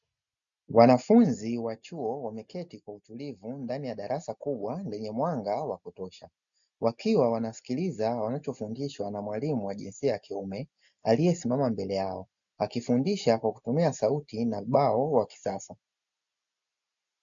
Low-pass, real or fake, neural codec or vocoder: 7.2 kHz; real; none